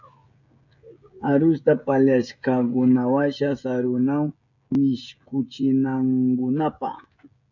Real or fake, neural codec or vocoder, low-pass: fake; codec, 16 kHz, 16 kbps, FreqCodec, smaller model; 7.2 kHz